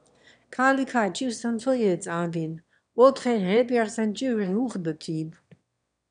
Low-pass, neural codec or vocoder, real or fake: 9.9 kHz; autoencoder, 22.05 kHz, a latent of 192 numbers a frame, VITS, trained on one speaker; fake